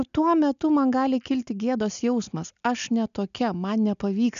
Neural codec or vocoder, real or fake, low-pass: none; real; 7.2 kHz